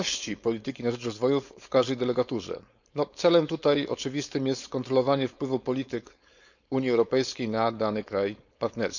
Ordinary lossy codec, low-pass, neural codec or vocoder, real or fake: none; 7.2 kHz; codec, 16 kHz, 4.8 kbps, FACodec; fake